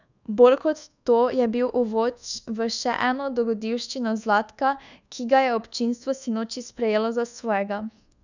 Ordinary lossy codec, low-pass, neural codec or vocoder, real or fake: none; 7.2 kHz; codec, 24 kHz, 1.2 kbps, DualCodec; fake